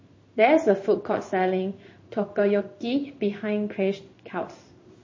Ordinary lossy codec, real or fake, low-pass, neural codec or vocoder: MP3, 32 kbps; fake; 7.2 kHz; codec, 16 kHz in and 24 kHz out, 1 kbps, XY-Tokenizer